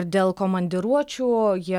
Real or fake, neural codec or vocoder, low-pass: real; none; 19.8 kHz